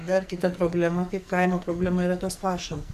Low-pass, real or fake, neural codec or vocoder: 14.4 kHz; fake; codec, 44.1 kHz, 2.6 kbps, SNAC